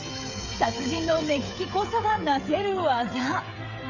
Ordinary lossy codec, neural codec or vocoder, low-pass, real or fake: none; codec, 16 kHz, 16 kbps, FreqCodec, smaller model; 7.2 kHz; fake